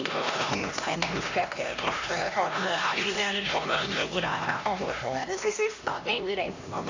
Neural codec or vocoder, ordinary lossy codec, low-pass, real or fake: codec, 16 kHz, 1 kbps, X-Codec, HuBERT features, trained on LibriSpeech; AAC, 48 kbps; 7.2 kHz; fake